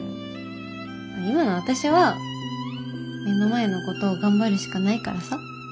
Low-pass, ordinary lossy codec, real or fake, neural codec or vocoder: none; none; real; none